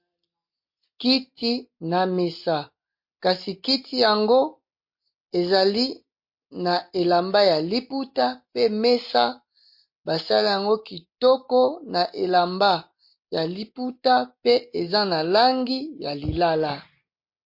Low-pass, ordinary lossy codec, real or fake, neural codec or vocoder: 5.4 kHz; MP3, 32 kbps; real; none